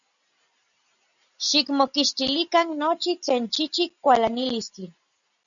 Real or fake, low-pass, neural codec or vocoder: real; 7.2 kHz; none